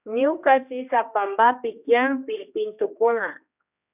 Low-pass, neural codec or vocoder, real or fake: 3.6 kHz; codec, 16 kHz, 1 kbps, X-Codec, HuBERT features, trained on general audio; fake